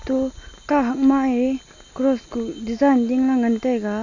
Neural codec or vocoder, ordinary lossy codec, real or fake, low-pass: none; AAC, 48 kbps; real; 7.2 kHz